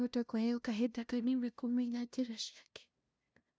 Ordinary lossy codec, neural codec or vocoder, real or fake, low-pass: none; codec, 16 kHz, 0.5 kbps, FunCodec, trained on LibriTTS, 25 frames a second; fake; none